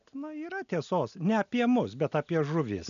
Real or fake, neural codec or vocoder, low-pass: real; none; 7.2 kHz